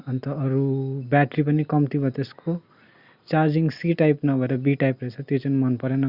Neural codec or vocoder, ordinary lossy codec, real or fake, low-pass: none; Opus, 64 kbps; real; 5.4 kHz